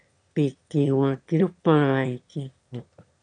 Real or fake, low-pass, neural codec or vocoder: fake; 9.9 kHz; autoencoder, 22.05 kHz, a latent of 192 numbers a frame, VITS, trained on one speaker